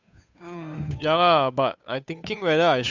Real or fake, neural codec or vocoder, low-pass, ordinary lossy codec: fake; codec, 16 kHz, 8 kbps, FunCodec, trained on Chinese and English, 25 frames a second; 7.2 kHz; none